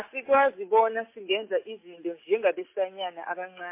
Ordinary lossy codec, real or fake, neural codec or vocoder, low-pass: MP3, 24 kbps; real; none; 3.6 kHz